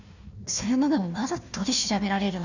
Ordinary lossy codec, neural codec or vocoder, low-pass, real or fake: none; codec, 16 kHz, 1 kbps, FunCodec, trained on Chinese and English, 50 frames a second; 7.2 kHz; fake